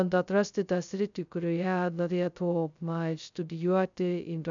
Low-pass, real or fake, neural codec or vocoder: 7.2 kHz; fake; codec, 16 kHz, 0.2 kbps, FocalCodec